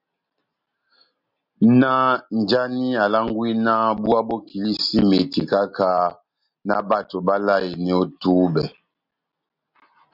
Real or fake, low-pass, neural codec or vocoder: real; 5.4 kHz; none